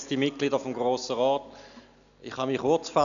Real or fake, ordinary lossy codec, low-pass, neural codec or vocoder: real; none; 7.2 kHz; none